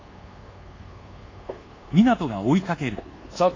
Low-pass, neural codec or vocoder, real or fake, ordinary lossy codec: 7.2 kHz; codec, 24 kHz, 1.2 kbps, DualCodec; fake; AAC, 32 kbps